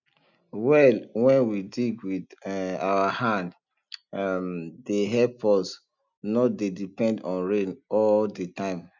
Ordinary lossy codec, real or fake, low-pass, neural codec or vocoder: none; real; 7.2 kHz; none